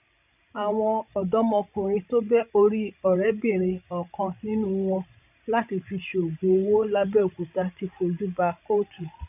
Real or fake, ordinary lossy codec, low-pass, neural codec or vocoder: fake; none; 3.6 kHz; codec, 16 kHz, 16 kbps, FreqCodec, larger model